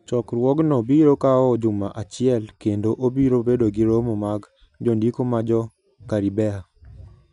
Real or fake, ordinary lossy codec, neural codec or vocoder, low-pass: real; none; none; 10.8 kHz